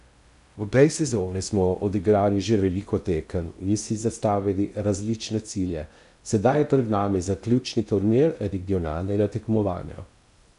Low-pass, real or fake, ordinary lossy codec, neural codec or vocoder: 10.8 kHz; fake; none; codec, 16 kHz in and 24 kHz out, 0.6 kbps, FocalCodec, streaming, 2048 codes